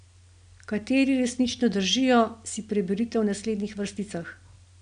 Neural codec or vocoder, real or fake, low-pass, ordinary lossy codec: none; real; 9.9 kHz; none